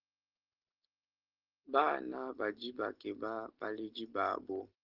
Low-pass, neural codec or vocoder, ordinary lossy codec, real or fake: 5.4 kHz; none; Opus, 16 kbps; real